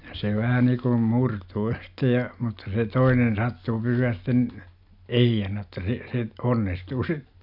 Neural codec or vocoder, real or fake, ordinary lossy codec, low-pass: none; real; none; 5.4 kHz